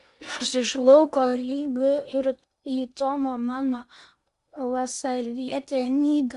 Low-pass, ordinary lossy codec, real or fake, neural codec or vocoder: 10.8 kHz; Opus, 64 kbps; fake; codec, 16 kHz in and 24 kHz out, 0.8 kbps, FocalCodec, streaming, 65536 codes